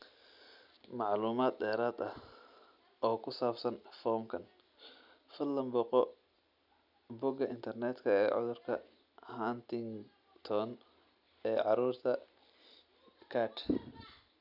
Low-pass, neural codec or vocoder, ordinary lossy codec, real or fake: 5.4 kHz; none; none; real